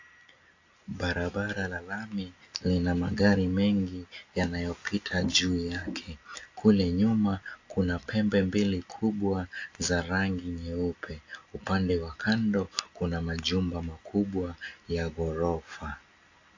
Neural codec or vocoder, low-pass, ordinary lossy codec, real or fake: none; 7.2 kHz; AAC, 48 kbps; real